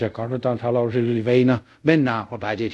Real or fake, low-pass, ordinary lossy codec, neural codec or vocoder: fake; none; none; codec, 24 kHz, 0.5 kbps, DualCodec